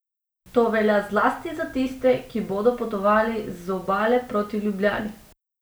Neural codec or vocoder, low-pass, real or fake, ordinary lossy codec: none; none; real; none